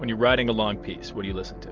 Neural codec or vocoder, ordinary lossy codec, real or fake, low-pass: none; Opus, 24 kbps; real; 7.2 kHz